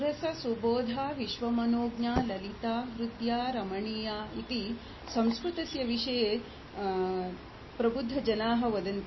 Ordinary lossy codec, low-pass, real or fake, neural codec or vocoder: MP3, 24 kbps; 7.2 kHz; real; none